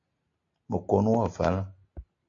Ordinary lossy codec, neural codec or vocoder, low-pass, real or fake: AAC, 48 kbps; none; 7.2 kHz; real